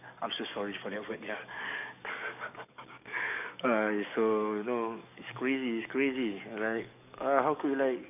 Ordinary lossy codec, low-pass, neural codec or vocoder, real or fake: none; 3.6 kHz; codec, 44.1 kHz, 7.8 kbps, DAC; fake